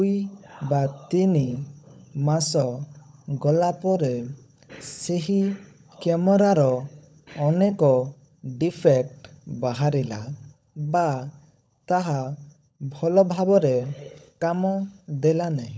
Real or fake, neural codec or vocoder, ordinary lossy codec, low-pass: fake; codec, 16 kHz, 16 kbps, FunCodec, trained on LibriTTS, 50 frames a second; none; none